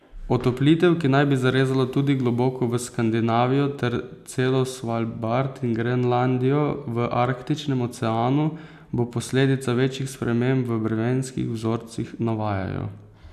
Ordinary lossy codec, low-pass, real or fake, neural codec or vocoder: none; 14.4 kHz; real; none